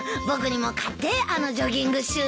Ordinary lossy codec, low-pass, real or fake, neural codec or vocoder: none; none; real; none